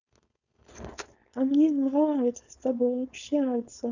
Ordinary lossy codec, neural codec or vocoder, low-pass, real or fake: none; codec, 16 kHz, 4.8 kbps, FACodec; 7.2 kHz; fake